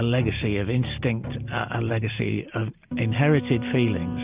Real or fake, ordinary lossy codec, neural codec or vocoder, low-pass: real; Opus, 24 kbps; none; 3.6 kHz